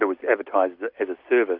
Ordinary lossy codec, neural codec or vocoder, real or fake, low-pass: MP3, 48 kbps; none; real; 5.4 kHz